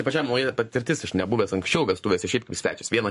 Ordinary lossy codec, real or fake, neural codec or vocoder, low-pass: MP3, 48 kbps; fake; codec, 44.1 kHz, 7.8 kbps, Pupu-Codec; 14.4 kHz